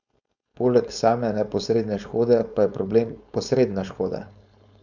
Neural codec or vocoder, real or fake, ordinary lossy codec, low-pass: codec, 16 kHz, 4.8 kbps, FACodec; fake; none; 7.2 kHz